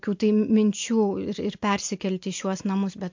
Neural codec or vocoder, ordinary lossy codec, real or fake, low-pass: none; MP3, 48 kbps; real; 7.2 kHz